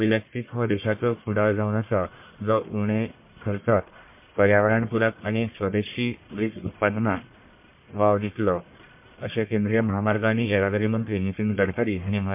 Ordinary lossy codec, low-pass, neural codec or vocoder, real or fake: MP3, 32 kbps; 3.6 kHz; codec, 44.1 kHz, 1.7 kbps, Pupu-Codec; fake